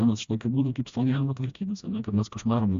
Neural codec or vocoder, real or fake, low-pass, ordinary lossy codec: codec, 16 kHz, 1 kbps, FreqCodec, smaller model; fake; 7.2 kHz; AAC, 64 kbps